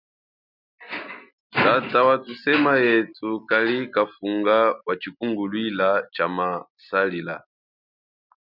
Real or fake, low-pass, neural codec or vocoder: real; 5.4 kHz; none